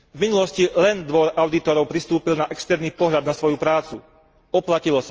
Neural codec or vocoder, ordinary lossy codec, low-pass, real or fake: none; Opus, 24 kbps; 7.2 kHz; real